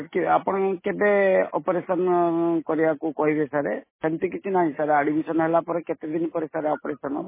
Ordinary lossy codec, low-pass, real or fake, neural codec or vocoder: MP3, 16 kbps; 3.6 kHz; real; none